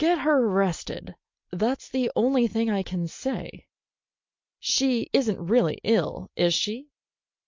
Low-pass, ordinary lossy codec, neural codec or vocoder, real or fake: 7.2 kHz; MP3, 48 kbps; none; real